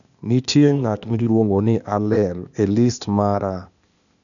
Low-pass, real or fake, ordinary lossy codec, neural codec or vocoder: 7.2 kHz; fake; none; codec, 16 kHz, 0.8 kbps, ZipCodec